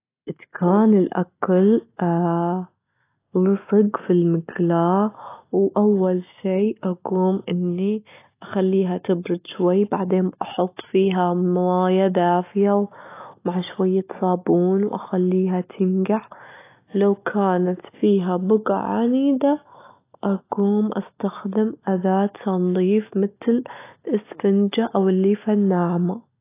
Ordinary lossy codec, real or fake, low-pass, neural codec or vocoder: AAC, 24 kbps; real; 3.6 kHz; none